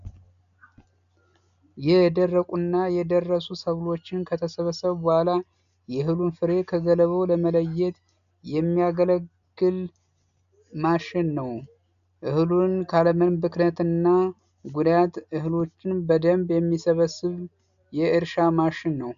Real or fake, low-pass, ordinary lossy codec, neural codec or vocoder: real; 7.2 kHz; AAC, 96 kbps; none